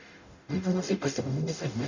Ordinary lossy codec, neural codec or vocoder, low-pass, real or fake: none; codec, 44.1 kHz, 0.9 kbps, DAC; 7.2 kHz; fake